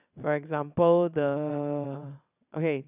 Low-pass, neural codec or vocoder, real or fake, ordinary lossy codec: 3.6 kHz; vocoder, 22.05 kHz, 80 mel bands, WaveNeXt; fake; none